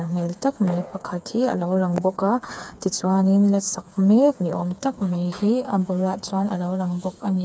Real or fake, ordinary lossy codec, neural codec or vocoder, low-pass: fake; none; codec, 16 kHz, 4 kbps, FreqCodec, smaller model; none